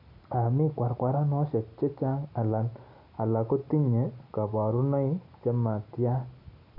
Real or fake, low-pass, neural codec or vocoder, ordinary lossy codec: real; 5.4 kHz; none; none